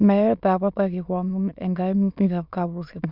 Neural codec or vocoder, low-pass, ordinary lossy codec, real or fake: autoencoder, 22.05 kHz, a latent of 192 numbers a frame, VITS, trained on many speakers; 5.4 kHz; Opus, 64 kbps; fake